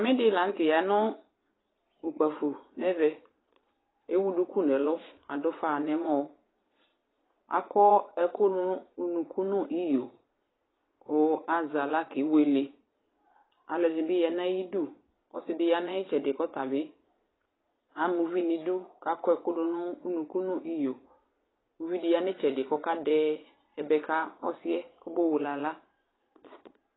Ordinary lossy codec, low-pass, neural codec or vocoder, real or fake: AAC, 16 kbps; 7.2 kHz; vocoder, 22.05 kHz, 80 mel bands, Vocos; fake